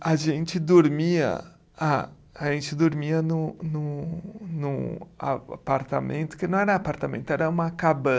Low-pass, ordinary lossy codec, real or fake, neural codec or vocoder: none; none; real; none